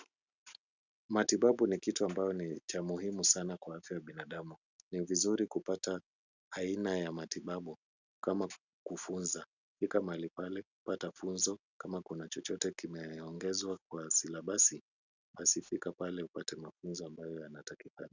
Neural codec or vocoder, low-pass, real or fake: none; 7.2 kHz; real